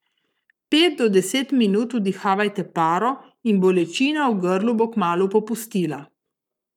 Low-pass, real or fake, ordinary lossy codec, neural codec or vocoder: 19.8 kHz; fake; none; codec, 44.1 kHz, 7.8 kbps, Pupu-Codec